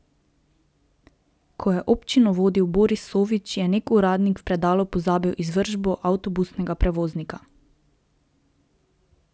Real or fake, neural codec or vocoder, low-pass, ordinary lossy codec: real; none; none; none